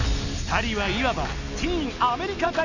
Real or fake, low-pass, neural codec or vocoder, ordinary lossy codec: real; 7.2 kHz; none; none